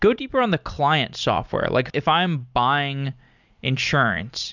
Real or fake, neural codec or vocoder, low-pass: real; none; 7.2 kHz